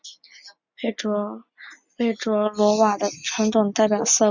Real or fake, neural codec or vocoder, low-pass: real; none; 7.2 kHz